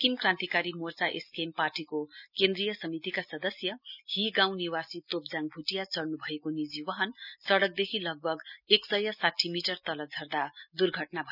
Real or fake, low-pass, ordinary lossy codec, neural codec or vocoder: real; 5.4 kHz; none; none